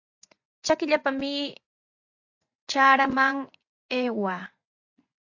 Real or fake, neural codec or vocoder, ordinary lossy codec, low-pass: fake; vocoder, 24 kHz, 100 mel bands, Vocos; AAC, 48 kbps; 7.2 kHz